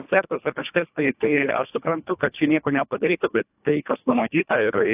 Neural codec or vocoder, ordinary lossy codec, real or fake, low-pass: codec, 24 kHz, 1.5 kbps, HILCodec; AAC, 32 kbps; fake; 3.6 kHz